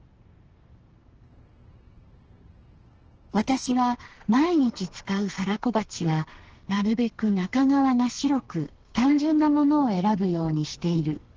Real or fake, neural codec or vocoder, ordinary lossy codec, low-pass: fake; codec, 32 kHz, 1.9 kbps, SNAC; Opus, 16 kbps; 7.2 kHz